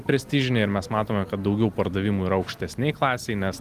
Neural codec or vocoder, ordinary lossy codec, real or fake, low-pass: none; Opus, 24 kbps; real; 14.4 kHz